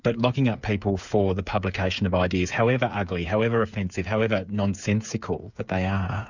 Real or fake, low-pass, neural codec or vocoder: fake; 7.2 kHz; codec, 16 kHz, 8 kbps, FreqCodec, smaller model